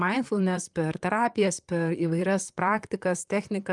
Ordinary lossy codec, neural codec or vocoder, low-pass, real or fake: Opus, 32 kbps; vocoder, 44.1 kHz, 128 mel bands, Pupu-Vocoder; 10.8 kHz; fake